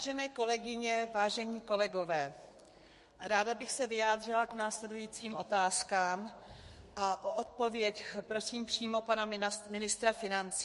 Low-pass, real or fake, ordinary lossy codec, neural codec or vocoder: 14.4 kHz; fake; MP3, 48 kbps; codec, 32 kHz, 1.9 kbps, SNAC